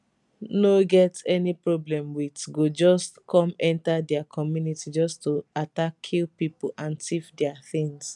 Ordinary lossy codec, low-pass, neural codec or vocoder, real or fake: none; 10.8 kHz; none; real